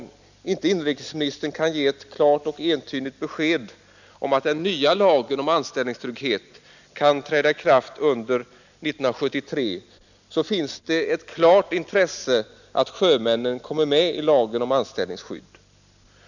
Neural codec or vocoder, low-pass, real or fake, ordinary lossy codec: none; 7.2 kHz; real; none